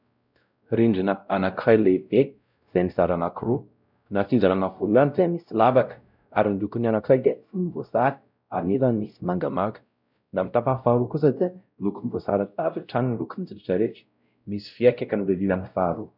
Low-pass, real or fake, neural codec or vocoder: 5.4 kHz; fake; codec, 16 kHz, 0.5 kbps, X-Codec, WavLM features, trained on Multilingual LibriSpeech